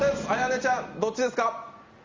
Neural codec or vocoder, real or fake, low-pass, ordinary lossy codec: none; real; 7.2 kHz; Opus, 32 kbps